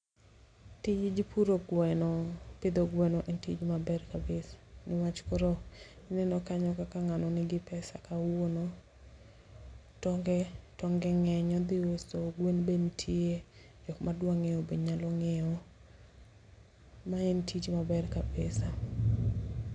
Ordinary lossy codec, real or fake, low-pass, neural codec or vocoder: none; real; 9.9 kHz; none